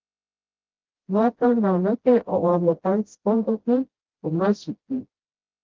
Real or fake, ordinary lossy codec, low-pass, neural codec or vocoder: fake; Opus, 16 kbps; 7.2 kHz; codec, 16 kHz, 0.5 kbps, FreqCodec, smaller model